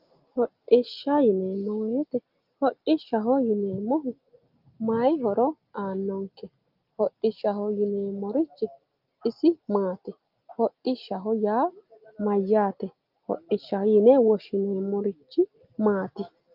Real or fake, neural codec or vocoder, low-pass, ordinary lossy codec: real; none; 5.4 kHz; Opus, 24 kbps